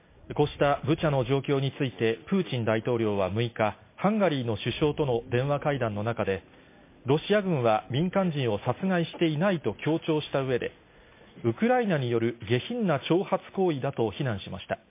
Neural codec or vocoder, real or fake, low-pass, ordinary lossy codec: none; real; 3.6 kHz; MP3, 24 kbps